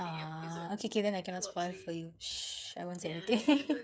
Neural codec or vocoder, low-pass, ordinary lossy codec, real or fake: codec, 16 kHz, 8 kbps, FreqCodec, smaller model; none; none; fake